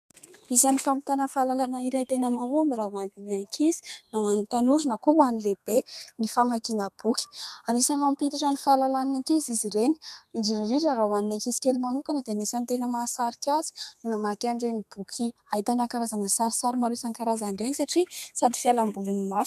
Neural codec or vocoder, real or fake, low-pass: codec, 32 kHz, 1.9 kbps, SNAC; fake; 14.4 kHz